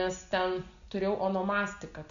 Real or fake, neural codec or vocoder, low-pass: real; none; 7.2 kHz